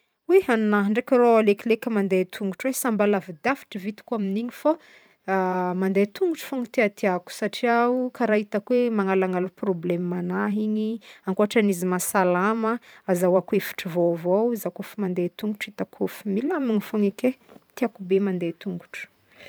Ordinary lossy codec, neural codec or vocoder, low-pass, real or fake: none; vocoder, 44.1 kHz, 128 mel bands every 512 samples, BigVGAN v2; none; fake